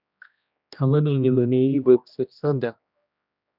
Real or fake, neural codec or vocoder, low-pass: fake; codec, 16 kHz, 1 kbps, X-Codec, HuBERT features, trained on general audio; 5.4 kHz